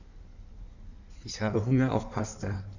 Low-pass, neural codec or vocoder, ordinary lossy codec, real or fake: 7.2 kHz; codec, 16 kHz in and 24 kHz out, 1.1 kbps, FireRedTTS-2 codec; none; fake